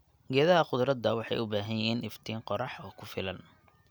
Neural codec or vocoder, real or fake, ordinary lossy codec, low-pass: none; real; none; none